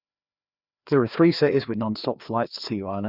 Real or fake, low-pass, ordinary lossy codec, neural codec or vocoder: fake; 5.4 kHz; Opus, 64 kbps; codec, 16 kHz, 2 kbps, FreqCodec, larger model